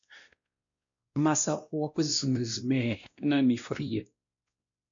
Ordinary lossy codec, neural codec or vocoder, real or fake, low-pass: AAC, 64 kbps; codec, 16 kHz, 1 kbps, X-Codec, WavLM features, trained on Multilingual LibriSpeech; fake; 7.2 kHz